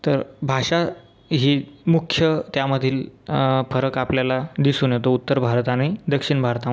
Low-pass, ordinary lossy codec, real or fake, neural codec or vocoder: none; none; real; none